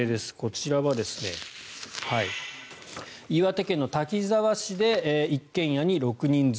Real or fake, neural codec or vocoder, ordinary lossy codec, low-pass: real; none; none; none